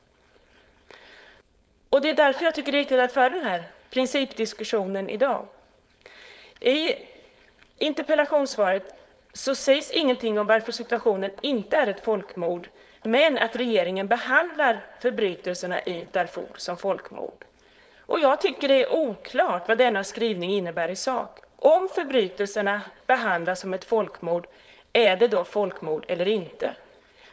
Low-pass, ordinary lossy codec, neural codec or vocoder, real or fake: none; none; codec, 16 kHz, 4.8 kbps, FACodec; fake